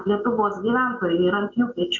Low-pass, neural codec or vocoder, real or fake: 7.2 kHz; none; real